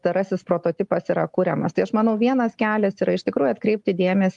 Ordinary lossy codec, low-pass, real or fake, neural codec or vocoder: Opus, 32 kbps; 10.8 kHz; real; none